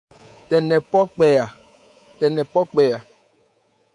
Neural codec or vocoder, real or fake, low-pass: codec, 24 kHz, 3.1 kbps, DualCodec; fake; 10.8 kHz